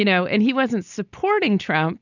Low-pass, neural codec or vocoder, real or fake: 7.2 kHz; none; real